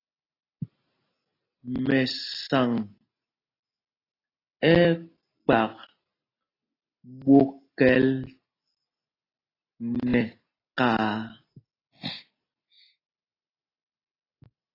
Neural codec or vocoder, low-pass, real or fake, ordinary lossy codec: none; 5.4 kHz; real; AAC, 24 kbps